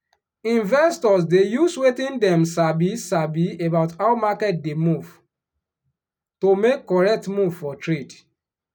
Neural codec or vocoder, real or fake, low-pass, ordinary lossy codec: none; real; 19.8 kHz; none